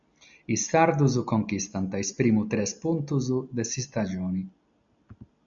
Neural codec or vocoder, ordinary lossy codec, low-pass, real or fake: none; MP3, 64 kbps; 7.2 kHz; real